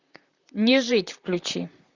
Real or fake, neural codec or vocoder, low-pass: fake; vocoder, 44.1 kHz, 128 mel bands, Pupu-Vocoder; 7.2 kHz